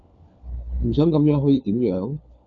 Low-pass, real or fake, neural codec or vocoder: 7.2 kHz; fake; codec, 16 kHz, 4 kbps, FunCodec, trained on LibriTTS, 50 frames a second